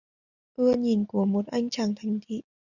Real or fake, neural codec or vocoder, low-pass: real; none; 7.2 kHz